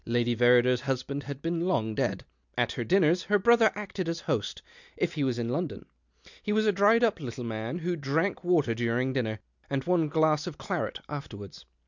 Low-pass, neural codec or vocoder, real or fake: 7.2 kHz; none; real